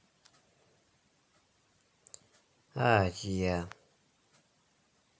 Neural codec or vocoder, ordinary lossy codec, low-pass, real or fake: none; none; none; real